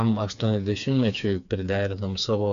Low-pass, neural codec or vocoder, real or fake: 7.2 kHz; codec, 16 kHz, 4 kbps, FreqCodec, smaller model; fake